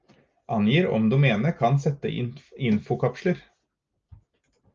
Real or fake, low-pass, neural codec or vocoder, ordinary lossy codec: real; 7.2 kHz; none; Opus, 24 kbps